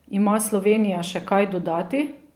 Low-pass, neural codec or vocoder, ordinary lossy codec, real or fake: 19.8 kHz; vocoder, 44.1 kHz, 128 mel bands every 512 samples, BigVGAN v2; Opus, 32 kbps; fake